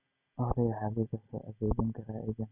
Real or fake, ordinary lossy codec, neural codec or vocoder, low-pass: real; none; none; 3.6 kHz